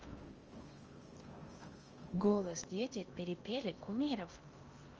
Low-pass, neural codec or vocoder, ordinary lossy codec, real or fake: 7.2 kHz; codec, 16 kHz in and 24 kHz out, 0.8 kbps, FocalCodec, streaming, 65536 codes; Opus, 24 kbps; fake